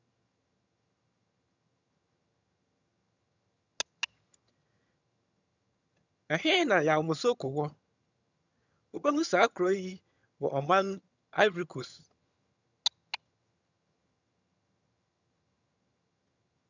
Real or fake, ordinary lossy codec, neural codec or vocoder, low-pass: fake; none; vocoder, 22.05 kHz, 80 mel bands, HiFi-GAN; 7.2 kHz